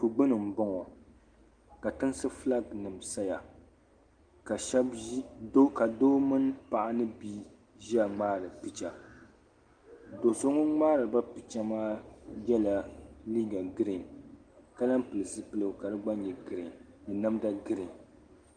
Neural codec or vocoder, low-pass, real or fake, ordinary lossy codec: none; 9.9 kHz; real; Opus, 24 kbps